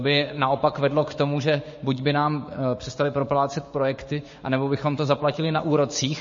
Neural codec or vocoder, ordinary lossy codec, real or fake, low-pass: codec, 16 kHz, 6 kbps, DAC; MP3, 32 kbps; fake; 7.2 kHz